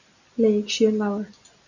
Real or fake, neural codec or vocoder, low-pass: real; none; 7.2 kHz